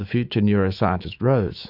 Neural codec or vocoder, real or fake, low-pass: autoencoder, 48 kHz, 128 numbers a frame, DAC-VAE, trained on Japanese speech; fake; 5.4 kHz